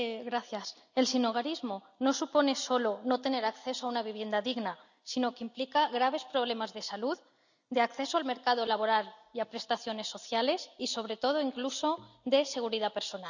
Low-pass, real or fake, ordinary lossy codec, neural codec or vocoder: 7.2 kHz; real; none; none